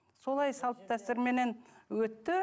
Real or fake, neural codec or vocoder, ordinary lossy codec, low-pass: real; none; none; none